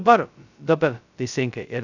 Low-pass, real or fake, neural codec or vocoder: 7.2 kHz; fake; codec, 16 kHz, 0.2 kbps, FocalCodec